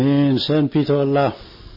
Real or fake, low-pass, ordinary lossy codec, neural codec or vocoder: real; 5.4 kHz; MP3, 24 kbps; none